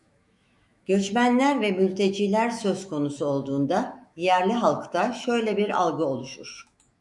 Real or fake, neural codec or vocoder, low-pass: fake; autoencoder, 48 kHz, 128 numbers a frame, DAC-VAE, trained on Japanese speech; 10.8 kHz